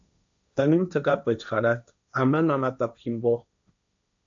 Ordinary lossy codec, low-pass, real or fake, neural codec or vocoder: AAC, 64 kbps; 7.2 kHz; fake; codec, 16 kHz, 1.1 kbps, Voila-Tokenizer